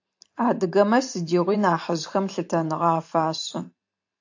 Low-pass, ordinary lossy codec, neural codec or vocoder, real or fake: 7.2 kHz; AAC, 48 kbps; vocoder, 44.1 kHz, 128 mel bands every 512 samples, BigVGAN v2; fake